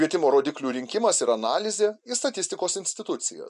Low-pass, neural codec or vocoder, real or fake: 10.8 kHz; none; real